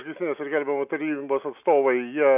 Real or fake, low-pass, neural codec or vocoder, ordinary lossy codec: real; 3.6 kHz; none; AAC, 32 kbps